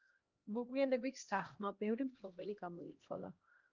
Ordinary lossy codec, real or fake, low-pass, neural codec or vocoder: Opus, 24 kbps; fake; 7.2 kHz; codec, 16 kHz, 1 kbps, X-Codec, HuBERT features, trained on LibriSpeech